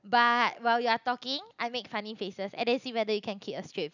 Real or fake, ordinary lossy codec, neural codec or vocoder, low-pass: real; none; none; 7.2 kHz